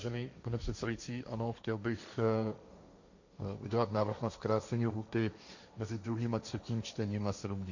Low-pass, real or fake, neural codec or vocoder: 7.2 kHz; fake; codec, 16 kHz, 1.1 kbps, Voila-Tokenizer